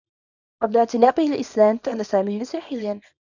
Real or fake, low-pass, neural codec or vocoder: fake; 7.2 kHz; codec, 24 kHz, 0.9 kbps, WavTokenizer, small release